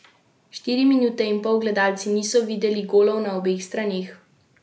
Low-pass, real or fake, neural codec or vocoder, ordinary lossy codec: none; real; none; none